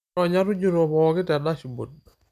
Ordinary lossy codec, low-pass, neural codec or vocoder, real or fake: none; 14.4 kHz; none; real